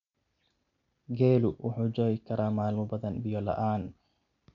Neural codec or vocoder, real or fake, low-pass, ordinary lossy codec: none; real; 7.2 kHz; none